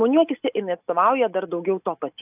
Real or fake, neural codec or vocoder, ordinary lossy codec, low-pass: real; none; AAC, 32 kbps; 3.6 kHz